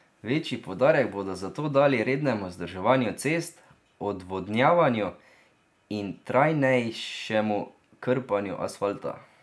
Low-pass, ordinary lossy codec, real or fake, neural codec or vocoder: none; none; real; none